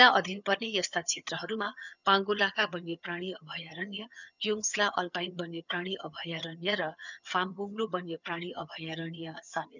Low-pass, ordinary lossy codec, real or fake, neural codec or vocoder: 7.2 kHz; none; fake; vocoder, 22.05 kHz, 80 mel bands, HiFi-GAN